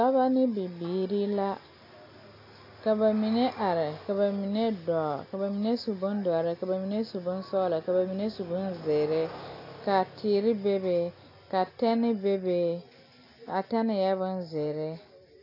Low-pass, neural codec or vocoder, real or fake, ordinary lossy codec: 5.4 kHz; none; real; AAC, 32 kbps